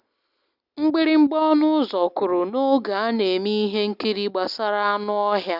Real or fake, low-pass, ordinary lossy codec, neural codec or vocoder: real; 5.4 kHz; none; none